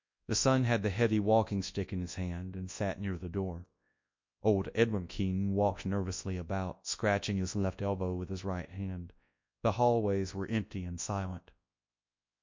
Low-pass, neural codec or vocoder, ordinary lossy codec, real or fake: 7.2 kHz; codec, 24 kHz, 0.9 kbps, WavTokenizer, large speech release; MP3, 64 kbps; fake